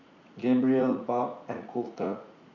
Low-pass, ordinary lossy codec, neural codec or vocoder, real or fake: 7.2 kHz; none; vocoder, 44.1 kHz, 80 mel bands, Vocos; fake